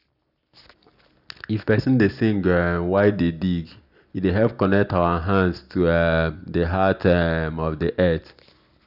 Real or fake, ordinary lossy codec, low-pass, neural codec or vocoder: real; none; 5.4 kHz; none